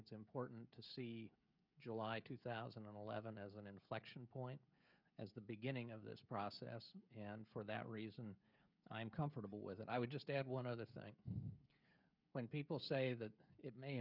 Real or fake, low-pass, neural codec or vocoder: fake; 5.4 kHz; codec, 16 kHz, 16 kbps, FreqCodec, smaller model